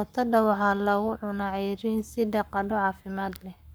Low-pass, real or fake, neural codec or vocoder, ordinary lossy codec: none; fake; codec, 44.1 kHz, 7.8 kbps, Pupu-Codec; none